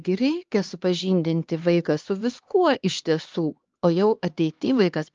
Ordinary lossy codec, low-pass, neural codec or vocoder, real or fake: Opus, 24 kbps; 7.2 kHz; codec, 16 kHz, 2 kbps, X-Codec, HuBERT features, trained on LibriSpeech; fake